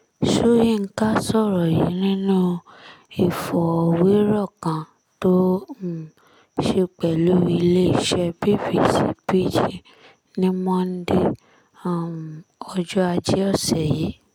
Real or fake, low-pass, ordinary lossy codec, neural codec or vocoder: real; 19.8 kHz; none; none